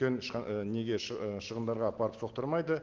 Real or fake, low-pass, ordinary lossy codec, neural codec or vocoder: real; 7.2 kHz; Opus, 16 kbps; none